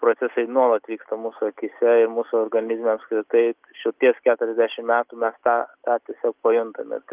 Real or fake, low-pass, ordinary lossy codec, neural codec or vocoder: real; 3.6 kHz; Opus, 24 kbps; none